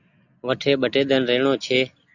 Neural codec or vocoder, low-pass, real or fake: none; 7.2 kHz; real